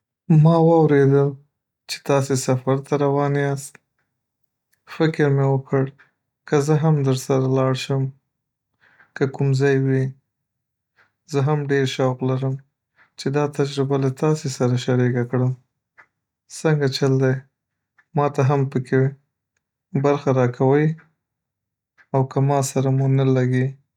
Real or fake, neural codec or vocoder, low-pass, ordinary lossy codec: real; none; 19.8 kHz; none